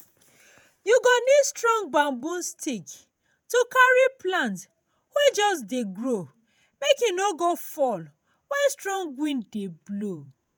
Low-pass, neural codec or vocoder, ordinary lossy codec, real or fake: none; vocoder, 48 kHz, 128 mel bands, Vocos; none; fake